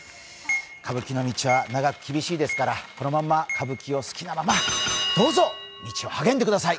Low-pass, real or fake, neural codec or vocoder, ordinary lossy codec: none; real; none; none